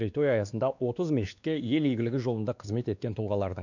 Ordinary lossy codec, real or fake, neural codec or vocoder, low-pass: none; fake; codec, 16 kHz, 2 kbps, X-Codec, WavLM features, trained on Multilingual LibriSpeech; 7.2 kHz